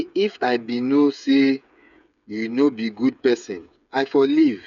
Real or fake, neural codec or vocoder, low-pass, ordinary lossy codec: fake; codec, 16 kHz, 8 kbps, FreqCodec, smaller model; 7.2 kHz; none